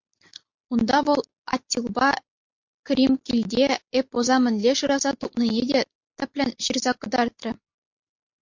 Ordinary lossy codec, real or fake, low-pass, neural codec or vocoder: MP3, 48 kbps; real; 7.2 kHz; none